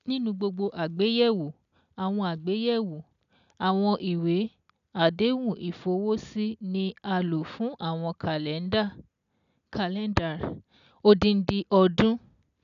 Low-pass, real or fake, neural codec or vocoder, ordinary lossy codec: 7.2 kHz; real; none; none